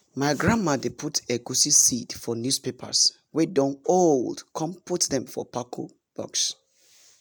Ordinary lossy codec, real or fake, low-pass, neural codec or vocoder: none; real; none; none